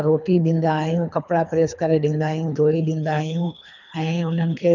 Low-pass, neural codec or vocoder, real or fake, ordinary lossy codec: 7.2 kHz; codec, 24 kHz, 3 kbps, HILCodec; fake; none